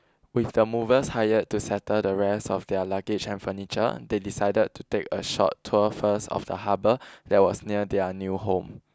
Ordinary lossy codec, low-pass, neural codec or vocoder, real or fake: none; none; none; real